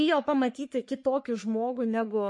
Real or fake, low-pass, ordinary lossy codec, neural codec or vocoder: fake; 10.8 kHz; MP3, 48 kbps; codec, 44.1 kHz, 3.4 kbps, Pupu-Codec